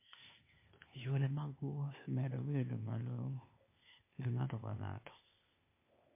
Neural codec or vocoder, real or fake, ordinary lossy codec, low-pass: codec, 16 kHz, 0.8 kbps, ZipCodec; fake; MP3, 24 kbps; 3.6 kHz